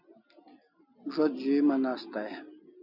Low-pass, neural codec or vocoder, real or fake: 5.4 kHz; none; real